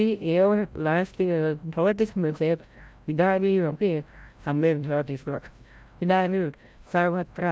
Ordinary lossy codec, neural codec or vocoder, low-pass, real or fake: none; codec, 16 kHz, 0.5 kbps, FreqCodec, larger model; none; fake